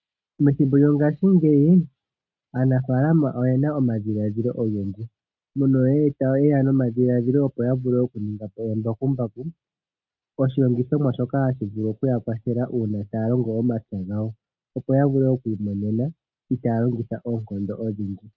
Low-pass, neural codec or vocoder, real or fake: 7.2 kHz; none; real